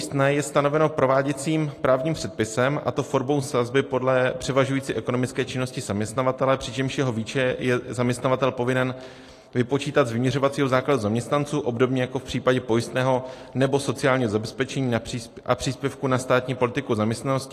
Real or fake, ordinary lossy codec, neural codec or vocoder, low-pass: real; AAC, 48 kbps; none; 14.4 kHz